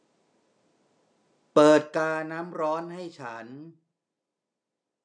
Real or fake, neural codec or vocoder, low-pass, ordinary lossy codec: real; none; 9.9 kHz; none